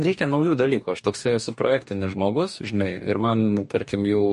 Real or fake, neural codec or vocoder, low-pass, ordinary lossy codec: fake; codec, 44.1 kHz, 2.6 kbps, DAC; 14.4 kHz; MP3, 48 kbps